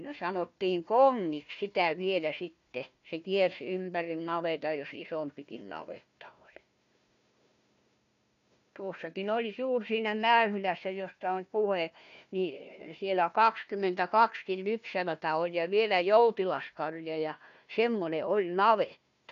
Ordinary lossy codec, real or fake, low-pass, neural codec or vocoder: none; fake; 7.2 kHz; codec, 16 kHz, 1 kbps, FunCodec, trained on Chinese and English, 50 frames a second